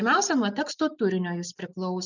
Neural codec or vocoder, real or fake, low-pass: none; real; 7.2 kHz